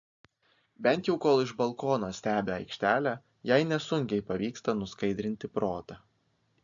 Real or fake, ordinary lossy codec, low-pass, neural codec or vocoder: real; AAC, 64 kbps; 7.2 kHz; none